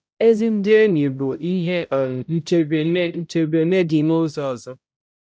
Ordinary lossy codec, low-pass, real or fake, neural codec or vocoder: none; none; fake; codec, 16 kHz, 0.5 kbps, X-Codec, HuBERT features, trained on balanced general audio